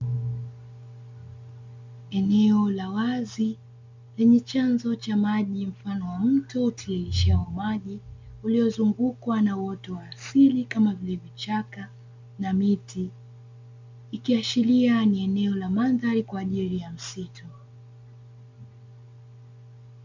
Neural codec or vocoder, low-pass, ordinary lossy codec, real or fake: none; 7.2 kHz; MP3, 64 kbps; real